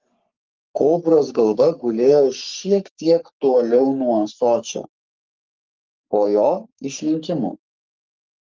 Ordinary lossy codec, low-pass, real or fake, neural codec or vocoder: Opus, 32 kbps; 7.2 kHz; fake; codec, 44.1 kHz, 3.4 kbps, Pupu-Codec